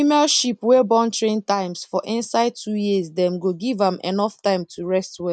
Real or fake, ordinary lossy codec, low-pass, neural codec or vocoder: real; none; none; none